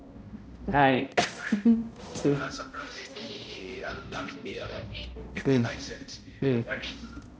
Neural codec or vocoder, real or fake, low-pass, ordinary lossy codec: codec, 16 kHz, 0.5 kbps, X-Codec, HuBERT features, trained on balanced general audio; fake; none; none